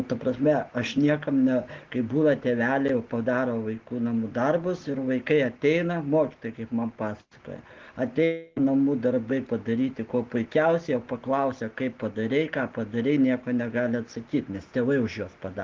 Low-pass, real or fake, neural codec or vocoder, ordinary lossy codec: 7.2 kHz; real; none; Opus, 16 kbps